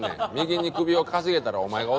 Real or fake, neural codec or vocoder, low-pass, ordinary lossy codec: real; none; none; none